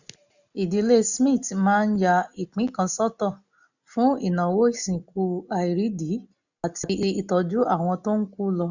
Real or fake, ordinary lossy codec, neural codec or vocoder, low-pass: real; none; none; 7.2 kHz